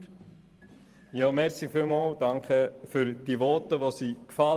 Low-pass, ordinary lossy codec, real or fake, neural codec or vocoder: 14.4 kHz; Opus, 32 kbps; fake; vocoder, 44.1 kHz, 128 mel bands every 512 samples, BigVGAN v2